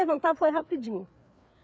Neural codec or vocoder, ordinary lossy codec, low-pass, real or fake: codec, 16 kHz, 4 kbps, FreqCodec, larger model; none; none; fake